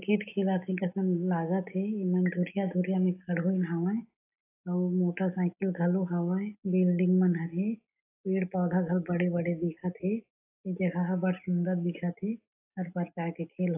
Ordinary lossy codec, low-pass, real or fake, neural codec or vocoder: AAC, 24 kbps; 3.6 kHz; real; none